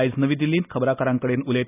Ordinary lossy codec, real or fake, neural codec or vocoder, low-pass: none; real; none; 3.6 kHz